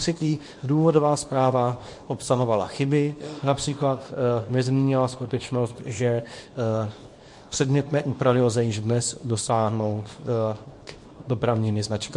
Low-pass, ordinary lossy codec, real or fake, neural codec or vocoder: 10.8 kHz; MP3, 48 kbps; fake; codec, 24 kHz, 0.9 kbps, WavTokenizer, small release